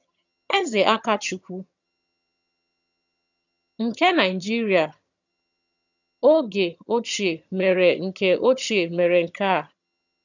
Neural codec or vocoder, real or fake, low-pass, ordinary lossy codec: vocoder, 22.05 kHz, 80 mel bands, HiFi-GAN; fake; 7.2 kHz; none